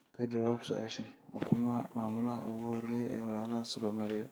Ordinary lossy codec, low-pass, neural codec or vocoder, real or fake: none; none; codec, 44.1 kHz, 2.6 kbps, SNAC; fake